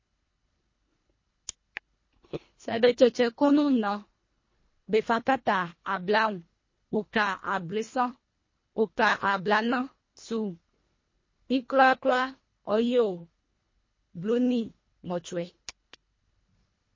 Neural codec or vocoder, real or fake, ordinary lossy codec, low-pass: codec, 24 kHz, 1.5 kbps, HILCodec; fake; MP3, 32 kbps; 7.2 kHz